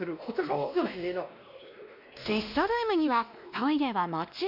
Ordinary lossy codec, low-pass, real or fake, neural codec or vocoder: none; 5.4 kHz; fake; codec, 16 kHz, 1 kbps, X-Codec, WavLM features, trained on Multilingual LibriSpeech